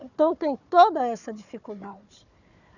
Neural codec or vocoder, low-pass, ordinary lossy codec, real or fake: codec, 16 kHz, 4 kbps, FunCodec, trained on Chinese and English, 50 frames a second; 7.2 kHz; none; fake